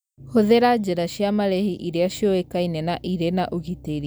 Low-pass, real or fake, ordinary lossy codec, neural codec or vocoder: none; real; none; none